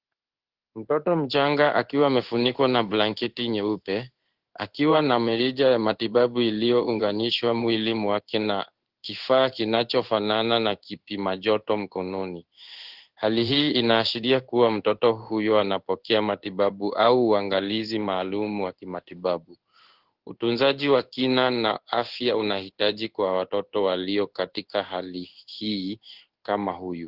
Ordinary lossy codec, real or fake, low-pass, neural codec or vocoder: Opus, 16 kbps; fake; 5.4 kHz; codec, 16 kHz in and 24 kHz out, 1 kbps, XY-Tokenizer